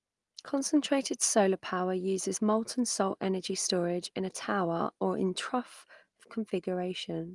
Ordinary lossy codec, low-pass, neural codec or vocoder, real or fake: Opus, 32 kbps; 10.8 kHz; none; real